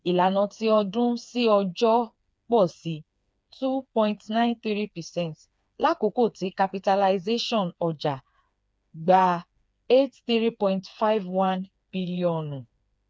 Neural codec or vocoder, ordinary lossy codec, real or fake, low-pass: codec, 16 kHz, 4 kbps, FreqCodec, smaller model; none; fake; none